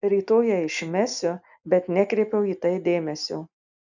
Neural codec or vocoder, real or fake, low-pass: none; real; 7.2 kHz